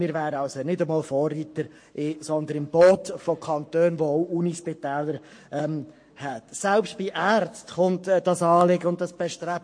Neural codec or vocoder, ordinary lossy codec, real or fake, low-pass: codec, 44.1 kHz, 7.8 kbps, Pupu-Codec; MP3, 48 kbps; fake; 9.9 kHz